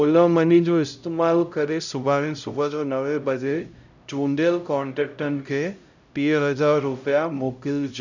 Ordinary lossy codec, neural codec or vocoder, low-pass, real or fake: none; codec, 16 kHz, 0.5 kbps, X-Codec, HuBERT features, trained on LibriSpeech; 7.2 kHz; fake